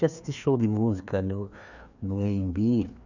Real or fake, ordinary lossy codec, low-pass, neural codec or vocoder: fake; none; 7.2 kHz; codec, 16 kHz, 2 kbps, FreqCodec, larger model